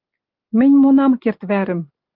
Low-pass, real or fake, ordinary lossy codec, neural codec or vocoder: 5.4 kHz; real; Opus, 24 kbps; none